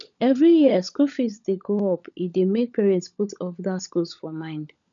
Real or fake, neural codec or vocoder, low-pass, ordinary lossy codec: fake; codec, 16 kHz, 16 kbps, FunCodec, trained on LibriTTS, 50 frames a second; 7.2 kHz; none